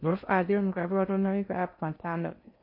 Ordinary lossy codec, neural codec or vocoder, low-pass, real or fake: MP3, 32 kbps; codec, 16 kHz in and 24 kHz out, 0.6 kbps, FocalCodec, streaming, 4096 codes; 5.4 kHz; fake